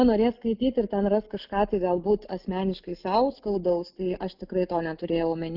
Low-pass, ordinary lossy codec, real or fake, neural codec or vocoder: 5.4 kHz; Opus, 24 kbps; real; none